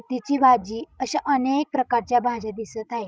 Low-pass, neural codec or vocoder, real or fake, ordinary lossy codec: none; codec, 16 kHz, 16 kbps, FreqCodec, larger model; fake; none